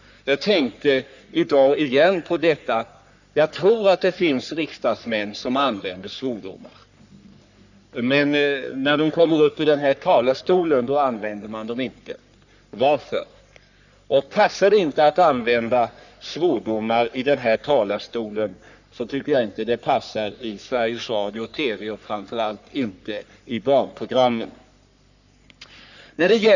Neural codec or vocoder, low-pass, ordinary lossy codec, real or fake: codec, 44.1 kHz, 3.4 kbps, Pupu-Codec; 7.2 kHz; none; fake